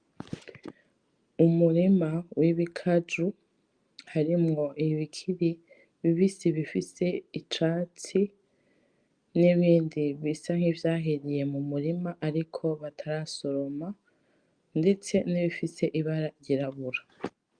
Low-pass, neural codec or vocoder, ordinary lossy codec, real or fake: 9.9 kHz; none; Opus, 32 kbps; real